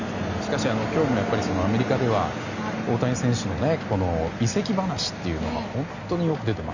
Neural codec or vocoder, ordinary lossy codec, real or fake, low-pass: none; none; real; 7.2 kHz